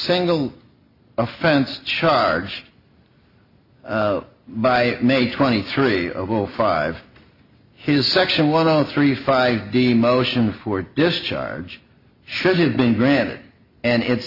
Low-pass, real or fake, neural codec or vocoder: 5.4 kHz; real; none